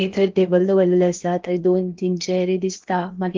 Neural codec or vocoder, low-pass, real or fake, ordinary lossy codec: codec, 16 kHz in and 24 kHz out, 0.8 kbps, FocalCodec, streaming, 65536 codes; 7.2 kHz; fake; Opus, 32 kbps